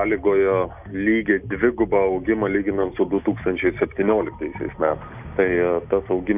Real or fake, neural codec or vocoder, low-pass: real; none; 3.6 kHz